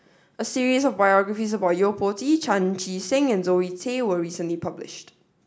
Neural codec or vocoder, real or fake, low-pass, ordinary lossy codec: none; real; none; none